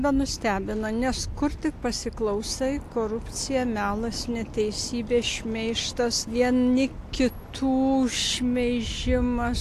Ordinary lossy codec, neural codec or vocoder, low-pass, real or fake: AAC, 64 kbps; none; 14.4 kHz; real